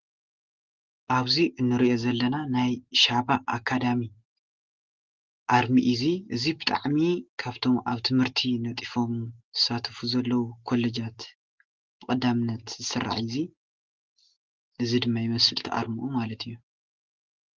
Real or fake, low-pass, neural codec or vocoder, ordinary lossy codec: real; 7.2 kHz; none; Opus, 16 kbps